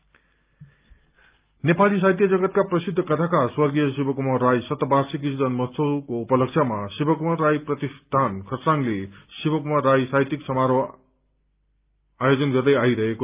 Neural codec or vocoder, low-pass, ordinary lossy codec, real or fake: none; 3.6 kHz; Opus, 24 kbps; real